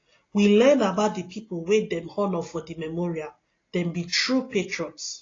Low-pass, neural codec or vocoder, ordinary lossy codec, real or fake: 7.2 kHz; none; AAC, 32 kbps; real